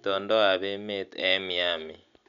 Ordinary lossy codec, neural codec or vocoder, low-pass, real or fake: none; none; 7.2 kHz; real